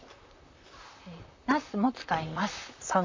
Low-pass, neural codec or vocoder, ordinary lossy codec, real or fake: 7.2 kHz; vocoder, 44.1 kHz, 128 mel bands, Pupu-Vocoder; MP3, 64 kbps; fake